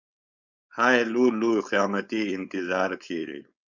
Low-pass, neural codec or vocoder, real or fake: 7.2 kHz; codec, 16 kHz, 4.8 kbps, FACodec; fake